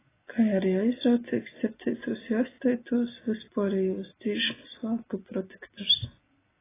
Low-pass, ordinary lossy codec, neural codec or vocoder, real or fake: 3.6 kHz; AAC, 16 kbps; none; real